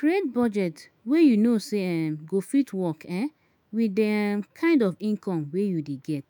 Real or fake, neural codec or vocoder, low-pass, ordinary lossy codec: fake; autoencoder, 48 kHz, 128 numbers a frame, DAC-VAE, trained on Japanese speech; none; none